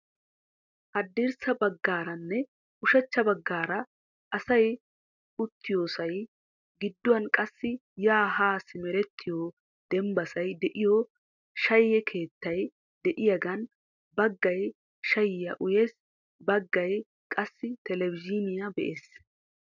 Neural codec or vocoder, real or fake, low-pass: none; real; 7.2 kHz